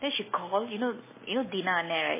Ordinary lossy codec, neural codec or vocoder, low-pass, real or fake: MP3, 16 kbps; none; 3.6 kHz; real